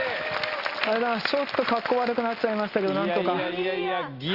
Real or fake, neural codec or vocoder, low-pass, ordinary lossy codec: real; none; 5.4 kHz; Opus, 32 kbps